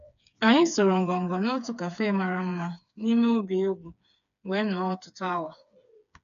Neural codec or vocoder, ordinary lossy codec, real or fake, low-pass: codec, 16 kHz, 4 kbps, FreqCodec, smaller model; none; fake; 7.2 kHz